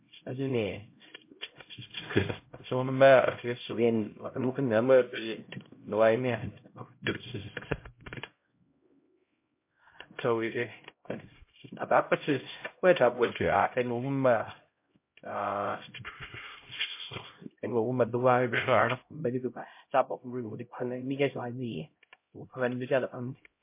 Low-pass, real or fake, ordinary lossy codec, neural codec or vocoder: 3.6 kHz; fake; MP3, 24 kbps; codec, 16 kHz, 0.5 kbps, X-Codec, HuBERT features, trained on LibriSpeech